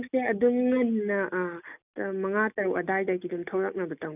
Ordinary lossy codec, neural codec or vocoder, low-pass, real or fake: none; none; 3.6 kHz; real